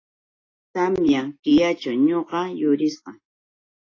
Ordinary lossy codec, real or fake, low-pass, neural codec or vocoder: AAC, 32 kbps; real; 7.2 kHz; none